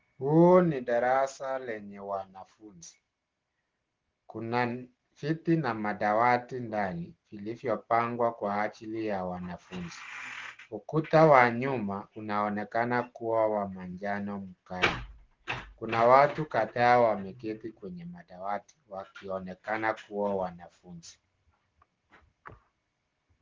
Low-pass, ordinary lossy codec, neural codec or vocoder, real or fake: 7.2 kHz; Opus, 16 kbps; none; real